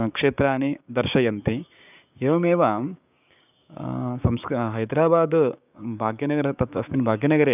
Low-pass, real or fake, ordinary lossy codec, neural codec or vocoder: 3.6 kHz; fake; none; codec, 16 kHz, 6 kbps, DAC